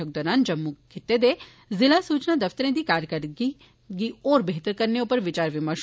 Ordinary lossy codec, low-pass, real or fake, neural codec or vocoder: none; none; real; none